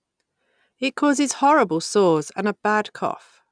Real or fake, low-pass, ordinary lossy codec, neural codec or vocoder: real; 9.9 kHz; none; none